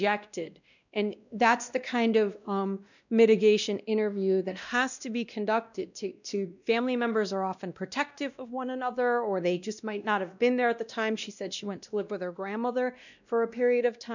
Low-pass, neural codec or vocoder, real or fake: 7.2 kHz; codec, 16 kHz, 1 kbps, X-Codec, WavLM features, trained on Multilingual LibriSpeech; fake